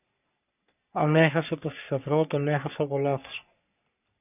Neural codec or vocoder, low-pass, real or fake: codec, 44.1 kHz, 3.4 kbps, Pupu-Codec; 3.6 kHz; fake